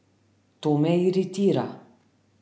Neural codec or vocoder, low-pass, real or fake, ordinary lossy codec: none; none; real; none